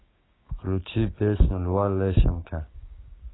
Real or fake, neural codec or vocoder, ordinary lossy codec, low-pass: fake; codec, 16 kHz, 6 kbps, DAC; AAC, 16 kbps; 7.2 kHz